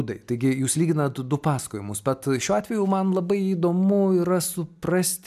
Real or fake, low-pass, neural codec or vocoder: real; 14.4 kHz; none